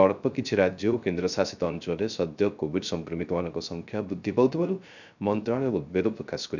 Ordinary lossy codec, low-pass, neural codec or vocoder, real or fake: none; 7.2 kHz; codec, 16 kHz, 0.3 kbps, FocalCodec; fake